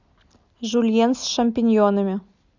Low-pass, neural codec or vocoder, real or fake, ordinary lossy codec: 7.2 kHz; none; real; Opus, 64 kbps